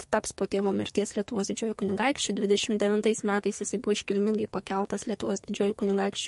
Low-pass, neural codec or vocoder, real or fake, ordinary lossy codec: 14.4 kHz; codec, 44.1 kHz, 2.6 kbps, SNAC; fake; MP3, 48 kbps